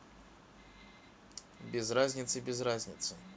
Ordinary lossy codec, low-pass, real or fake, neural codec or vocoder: none; none; real; none